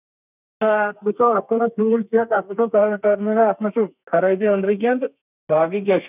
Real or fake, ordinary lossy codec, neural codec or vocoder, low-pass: fake; none; codec, 32 kHz, 1.9 kbps, SNAC; 3.6 kHz